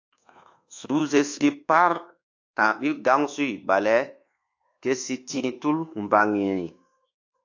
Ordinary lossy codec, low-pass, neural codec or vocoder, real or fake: AAC, 48 kbps; 7.2 kHz; codec, 24 kHz, 1.2 kbps, DualCodec; fake